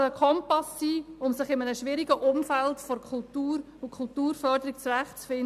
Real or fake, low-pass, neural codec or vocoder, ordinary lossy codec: real; 14.4 kHz; none; MP3, 96 kbps